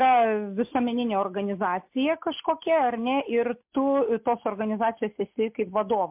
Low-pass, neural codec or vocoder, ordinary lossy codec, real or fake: 3.6 kHz; none; MP3, 32 kbps; real